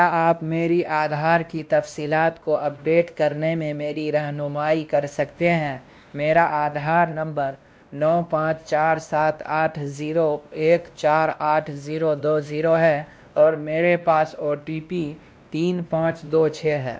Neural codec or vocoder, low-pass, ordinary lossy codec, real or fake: codec, 16 kHz, 1 kbps, X-Codec, WavLM features, trained on Multilingual LibriSpeech; none; none; fake